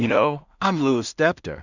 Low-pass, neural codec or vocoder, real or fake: 7.2 kHz; codec, 16 kHz in and 24 kHz out, 0.4 kbps, LongCat-Audio-Codec, two codebook decoder; fake